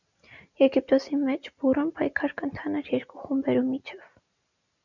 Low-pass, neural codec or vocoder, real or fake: 7.2 kHz; none; real